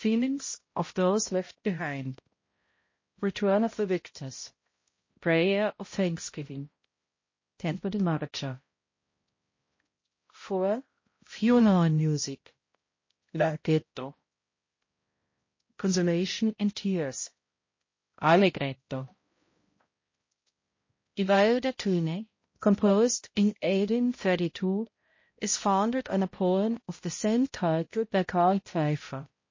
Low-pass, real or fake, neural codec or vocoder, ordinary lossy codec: 7.2 kHz; fake; codec, 16 kHz, 0.5 kbps, X-Codec, HuBERT features, trained on balanced general audio; MP3, 32 kbps